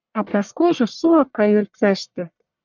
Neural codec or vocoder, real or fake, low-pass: codec, 44.1 kHz, 1.7 kbps, Pupu-Codec; fake; 7.2 kHz